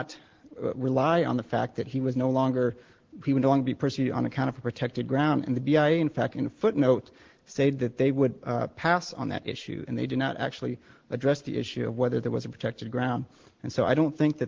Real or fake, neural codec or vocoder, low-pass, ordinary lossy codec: real; none; 7.2 kHz; Opus, 16 kbps